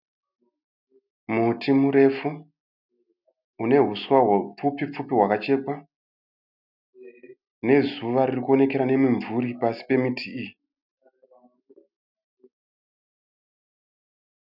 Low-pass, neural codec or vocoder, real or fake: 5.4 kHz; none; real